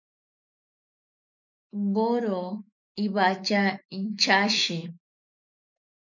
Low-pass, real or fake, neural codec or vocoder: 7.2 kHz; fake; autoencoder, 48 kHz, 128 numbers a frame, DAC-VAE, trained on Japanese speech